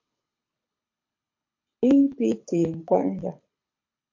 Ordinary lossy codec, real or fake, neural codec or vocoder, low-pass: MP3, 48 kbps; fake; codec, 24 kHz, 6 kbps, HILCodec; 7.2 kHz